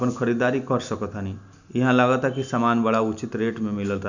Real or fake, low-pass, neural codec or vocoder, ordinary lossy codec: real; 7.2 kHz; none; none